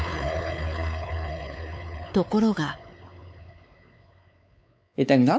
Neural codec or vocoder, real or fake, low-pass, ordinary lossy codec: codec, 16 kHz, 4 kbps, X-Codec, WavLM features, trained on Multilingual LibriSpeech; fake; none; none